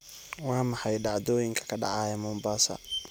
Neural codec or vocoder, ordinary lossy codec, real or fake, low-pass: none; none; real; none